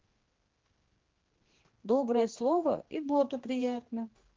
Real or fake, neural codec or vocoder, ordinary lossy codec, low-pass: fake; codec, 16 kHz, 2 kbps, X-Codec, HuBERT features, trained on general audio; Opus, 16 kbps; 7.2 kHz